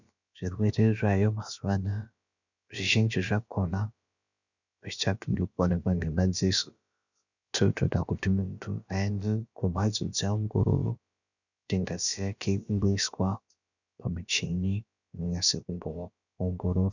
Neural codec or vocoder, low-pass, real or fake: codec, 16 kHz, about 1 kbps, DyCAST, with the encoder's durations; 7.2 kHz; fake